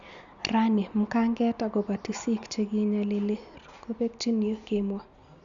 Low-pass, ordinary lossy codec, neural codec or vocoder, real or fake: 7.2 kHz; Opus, 64 kbps; none; real